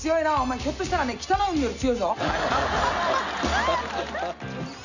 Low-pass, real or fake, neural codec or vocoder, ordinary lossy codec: 7.2 kHz; real; none; none